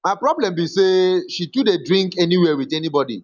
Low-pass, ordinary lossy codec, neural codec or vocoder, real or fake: 7.2 kHz; none; none; real